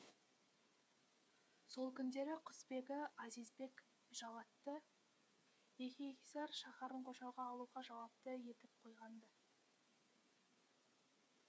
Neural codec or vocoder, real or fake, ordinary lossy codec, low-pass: codec, 16 kHz, 8 kbps, FreqCodec, smaller model; fake; none; none